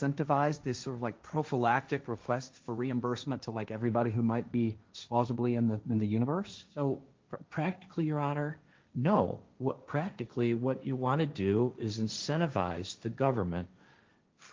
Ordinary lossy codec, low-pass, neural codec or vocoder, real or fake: Opus, 32 kbps; 7.2 kHz; codec, 16 kHz, 1.1 kbps, Voila-Tokenizer; fake